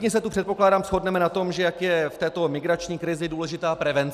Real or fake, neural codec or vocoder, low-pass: real; none; 14.4 kHz